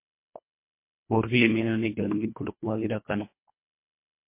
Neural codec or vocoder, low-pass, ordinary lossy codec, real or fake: codec, 24 kHz, 1.5 kbps, HILCodec; 3.6 kHz; MP3, 24 kbps; fake